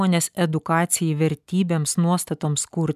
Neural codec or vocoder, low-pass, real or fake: none; 14.4 kHz; real